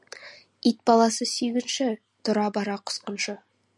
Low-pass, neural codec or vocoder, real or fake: 10.8 kHz; none; real